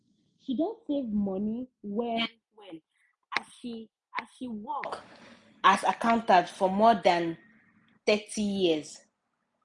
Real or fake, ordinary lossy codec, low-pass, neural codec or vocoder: real; none; 10.8 kHz; none